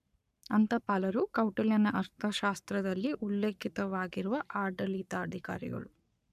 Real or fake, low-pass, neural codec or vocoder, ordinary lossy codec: fake; 14.4 kHz; codec, 44.1 kHz, 7.8 kbps, Pupu-Codec; none